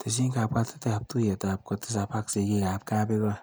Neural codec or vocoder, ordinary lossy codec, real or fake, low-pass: none; none; real; none